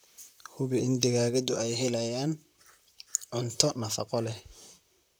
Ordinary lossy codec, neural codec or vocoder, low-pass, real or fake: none; vocoder, 44.1 kHz, 128 mel bands, Pupu-Vocoder; none; fake